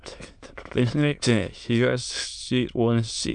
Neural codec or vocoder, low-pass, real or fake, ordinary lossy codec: autoencoder, 22.05 kHz, a latent of 192 numbers a frame, VITS, trained on many speakers; 9.9 kHz; fake; MP3, 96 kbps